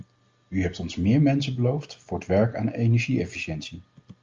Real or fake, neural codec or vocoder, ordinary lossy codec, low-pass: real; none; Opus, 32 kbps; 7.2 kHz